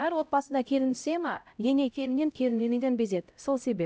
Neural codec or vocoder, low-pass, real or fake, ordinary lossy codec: codec, 16 kHz, 0.5 kbps, X-Codec, HuBERT features, trained on LibriSpeech; none; fake; none